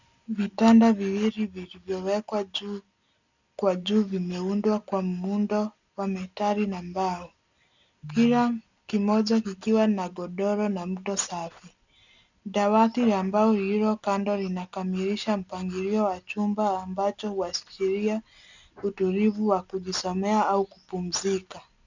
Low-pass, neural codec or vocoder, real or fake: 7.2 kHz; none; real